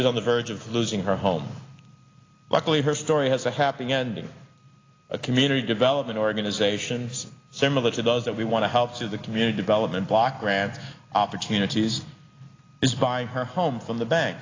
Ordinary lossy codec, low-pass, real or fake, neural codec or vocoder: AAC, 32 kbps; 7.2 kHz; real; none